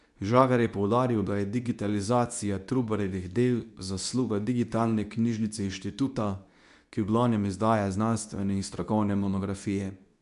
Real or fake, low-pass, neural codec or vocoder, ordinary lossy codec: fake; 10.8 kHz; codec, 24 kHz, 0.9 kbps, WavTokenizer, medium speech release version 2; none